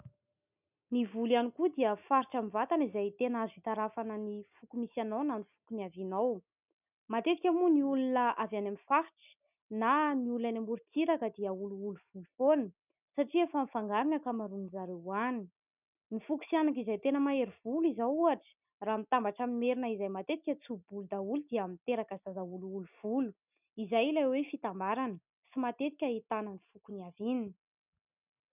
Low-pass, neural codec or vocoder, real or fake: 3.6 kHz; none; real